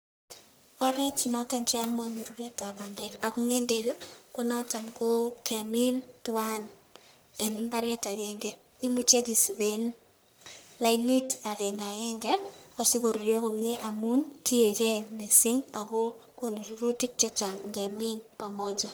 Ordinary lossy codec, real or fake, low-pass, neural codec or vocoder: none; fake; none; codec, 44.1 kHz, 1.7 kbps, Pupu-Codec